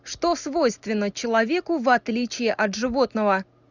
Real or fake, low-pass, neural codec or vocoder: real; 7.2 kHz; none